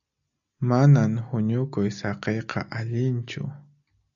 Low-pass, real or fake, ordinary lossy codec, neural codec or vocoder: 7.2 kHz; real; MP3, 96 kbps; none